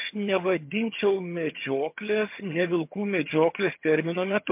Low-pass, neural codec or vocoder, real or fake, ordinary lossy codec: 3.6 kHz; vocoder, 22.05 kHz, 80 mel bands, HiFi-GAN; fake; MP3, 32 kbps